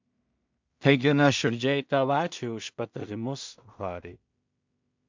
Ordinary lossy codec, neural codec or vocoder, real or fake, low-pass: MP3, 64 kbps; codec, 16 kHz in and 24 kHz out, 0.4 kbps, LongCat-Audio-Codec, two codebook decoder; fake; 7.2 kHz